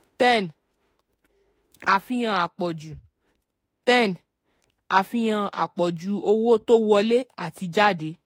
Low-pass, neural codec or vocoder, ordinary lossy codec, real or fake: 19.8 kHz; autoencoder, 48 kHz, 32 numbers a frame, DAC-VAE, trained on Japanese speech; AAC, 48 kbps; fake